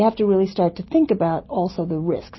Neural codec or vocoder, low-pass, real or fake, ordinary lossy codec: none; 7.2 kHz; real; MP3, 24 kbps